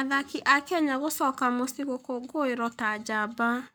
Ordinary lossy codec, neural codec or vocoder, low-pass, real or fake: none; codec, 44.1 kHz, 7.8 kbps, Pupu-Codec; none; fake